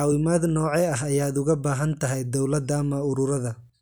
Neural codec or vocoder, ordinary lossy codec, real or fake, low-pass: none; none; real; none